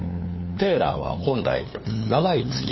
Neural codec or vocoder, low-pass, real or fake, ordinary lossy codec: codec, 16 kHz, 2 kbps, FunCodec, trained on LibriTTS, 25 frames a second; 7.2 kHz; fake; MP3, 24 kbps